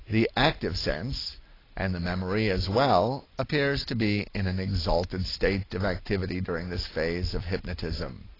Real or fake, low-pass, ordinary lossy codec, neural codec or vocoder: real; 5.4 kHz; AAC, 24 kbps; none